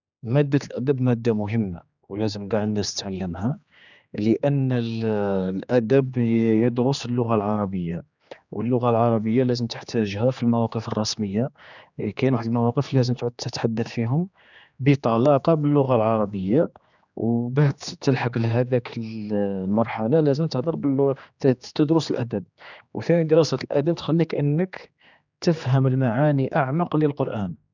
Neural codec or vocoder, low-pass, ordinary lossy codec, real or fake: codec, 16 kHz, 2 kbps, X-Codec, HuBERT features, trained on general audio; 7.2 kHz; none; fake